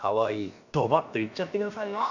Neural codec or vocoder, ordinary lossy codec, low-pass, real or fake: codec, 16 kHz, about 1 kbps, DyCAST, with the encoder's durations; none; 7.2 kHz; fake